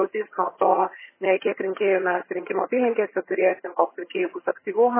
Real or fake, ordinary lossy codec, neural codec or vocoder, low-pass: fake; MP3, 16 kbps; vocoder, 22.05 kHz, 80 mel bands, HiFi-GAN; 3.6 kHz